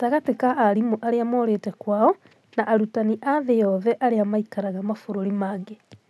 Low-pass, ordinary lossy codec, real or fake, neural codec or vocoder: none; none; real; none